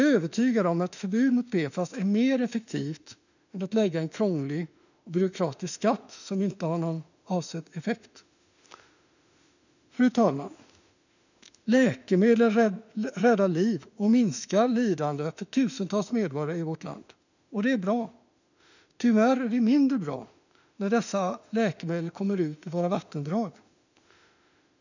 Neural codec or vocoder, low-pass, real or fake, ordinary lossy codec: autoencoder, 48 kHz, 32 numbers a frame, DAC-VAE, trained on Japanese speech; 7.2 kHz; fake; none